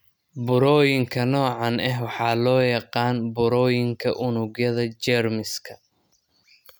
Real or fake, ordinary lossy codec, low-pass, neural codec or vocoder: real; none; none; none